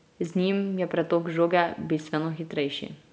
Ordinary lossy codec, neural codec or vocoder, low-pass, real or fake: none; none; none; real